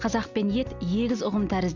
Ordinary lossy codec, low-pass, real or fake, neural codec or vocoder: Opus, 64 kbps; 7.2 kHz; real; none